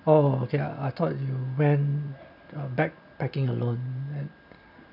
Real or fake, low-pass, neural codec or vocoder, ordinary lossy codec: real; 5.4 kHz; none; none